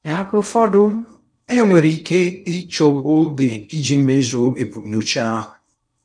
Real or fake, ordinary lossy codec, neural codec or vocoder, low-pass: fake; none; codec, 16 kHz in and 24 kHz out, 0.6 kbps, FocalCodec, streaming, 4096 codes; 9.9 kHz